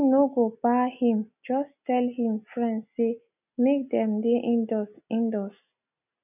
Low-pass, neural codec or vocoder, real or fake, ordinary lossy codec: 3.6 kHz; none; real; none